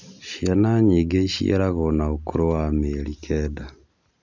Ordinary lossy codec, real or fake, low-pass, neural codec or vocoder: none; real; 7.2 kHz; none